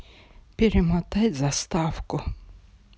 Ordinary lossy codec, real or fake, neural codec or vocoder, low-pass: none; real; none; none